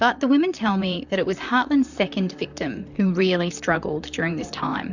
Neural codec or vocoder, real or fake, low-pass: vocoder, 44.1 kHz, 128 mel bands, Pupu-Vocoder; fake; 7.2 kHz